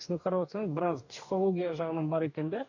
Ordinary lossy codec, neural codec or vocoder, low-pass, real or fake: none; codec, 44.1 kHz, 2.6 kbps, DAC; 7.2 kHz; fake